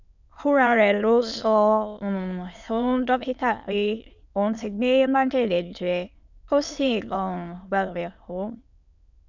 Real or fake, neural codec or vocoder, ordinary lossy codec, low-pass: fake; autoencoder, 22.05 kHz, a latent of 192 numbers a frame, VITS, trained on many speakers; none; 7.2 kHz